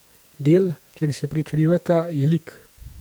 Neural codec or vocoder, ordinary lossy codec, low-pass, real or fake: codec, 44.1 kHz, 2.6 kbps, SNAC; none; none; fake